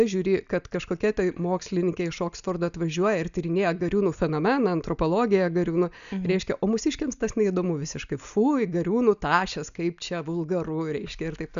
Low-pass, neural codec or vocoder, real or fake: 7.2 kHz; none; real